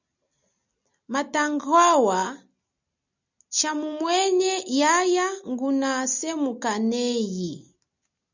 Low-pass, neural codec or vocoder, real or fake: 7.2 kHz; none; real